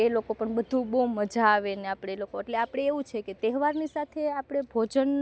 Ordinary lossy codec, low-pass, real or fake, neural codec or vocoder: none; none; real; none